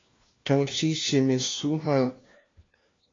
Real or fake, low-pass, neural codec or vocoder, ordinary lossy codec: fake; 7.2 kHz; codec, 16 kHz, 1 kbps, FunCodec, trained on LibriTTS, 50 frames a second; AAC, 32 kbps